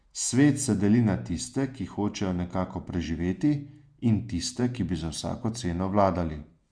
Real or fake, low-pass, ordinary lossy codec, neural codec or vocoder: fake; 9.9 kHz; Opus, 64 kbps; vocoder, 48 kHz, 128 mel bands, Vocos